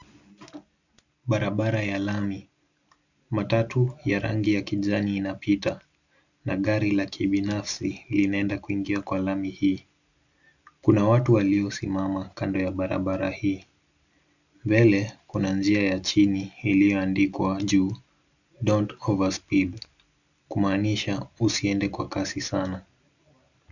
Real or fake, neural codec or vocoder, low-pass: real; none; 7.2 kHz